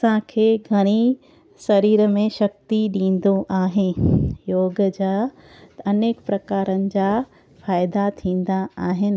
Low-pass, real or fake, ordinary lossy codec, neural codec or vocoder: none; real; none; none